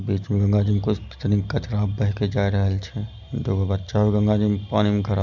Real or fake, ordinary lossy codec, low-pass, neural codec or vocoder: real; none; 7.2 kHz; none